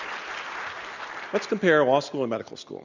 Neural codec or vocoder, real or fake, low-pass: none; real; 7.2 kHz